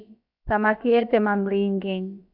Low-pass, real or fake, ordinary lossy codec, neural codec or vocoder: 5.4 kHz; fake; AAC, 48 kbps; codec, 16 kHz, about 1 kbps, DyCAST, with the encoder's durations